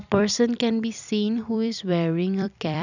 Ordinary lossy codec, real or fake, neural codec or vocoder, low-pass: none; fake; vocoder, 44.1 kHz, 128 mel bands every 256 samples, BigVGAN v2; 7.2 kHz